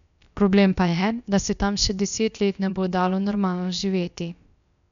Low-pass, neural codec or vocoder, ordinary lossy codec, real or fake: 7.2 kHz; codec, 16 kHz, about 1 kbps, DyCAST, with the encoder's durations; none; fake